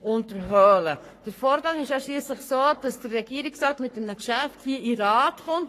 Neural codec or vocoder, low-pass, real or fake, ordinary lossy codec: codec, 44.1 kHz, 3.4 kbps, Pupu-Codec; 14.4 kHz; fake; AAC, 48 kbps